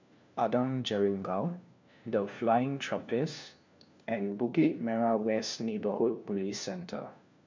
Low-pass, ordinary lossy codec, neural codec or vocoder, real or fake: 7.2 kHz; MP3, 64 kbps; codec, 16 kHz, 1 kbps, FunCodec, trained on LibriTTS, 50 frames a second; fake